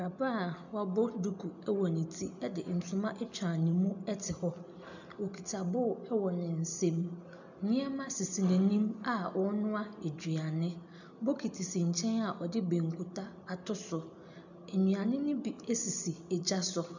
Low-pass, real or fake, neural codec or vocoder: 7.2 kHz; real; none